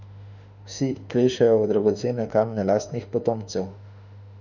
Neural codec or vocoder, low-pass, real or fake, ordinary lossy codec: autoencoder, 48 kHz, 32 numbers a frame, DAC-VAE, trained on Japanese speech; 7.2 kHz; fake; none